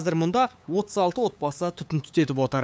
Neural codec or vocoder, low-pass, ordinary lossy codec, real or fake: codec, 16 kHz, 8 kbps, FunCodec, trained on LibriTTS, 25 frames a second; none; none; fake